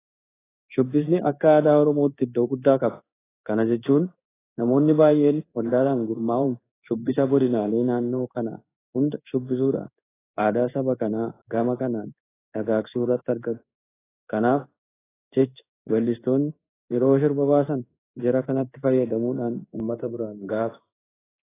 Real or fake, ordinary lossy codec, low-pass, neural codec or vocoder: fake; AAC, 16 kbps; 3.6 kHz; codec, 16 kHz in and 24 kHz out, 1 kbps, XY-Tokenizer